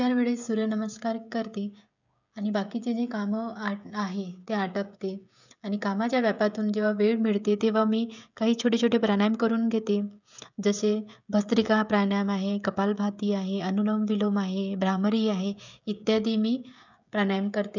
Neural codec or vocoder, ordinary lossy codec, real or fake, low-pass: codec, 16 kHz, 16 kbps, FreqCodec, smaller model; none; fake; 7.2 kHz